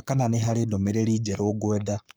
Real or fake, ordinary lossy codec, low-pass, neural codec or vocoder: fake; none; none; codec, 44.1 kHz, 7.8 kbps, Pupu-Codec